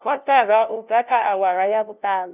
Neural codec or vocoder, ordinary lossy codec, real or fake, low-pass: codec, 16 kHz, 0.5 kbps, FunCodec, trained on LibriTTS, 25 frames a second; none; fake; 3.6 kHz